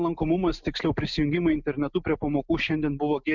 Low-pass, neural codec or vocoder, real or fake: 7.2 kHz; none; real